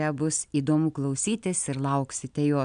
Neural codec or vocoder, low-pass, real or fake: none; 9.9 kHz; real